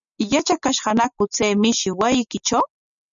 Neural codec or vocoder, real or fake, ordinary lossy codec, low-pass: none; real; MP3, 96 kbps; 7.2 kHz